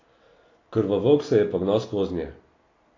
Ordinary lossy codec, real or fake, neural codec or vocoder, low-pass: AAC, 32 kbps; real; none; 7.2 kHz